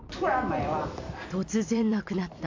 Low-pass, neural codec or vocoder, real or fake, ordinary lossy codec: 7.2 kHz; vocoder, 44.1 kHz, 128 mel bands every 256 samples, BigVGAN v2; fake; MP3, 64 kbps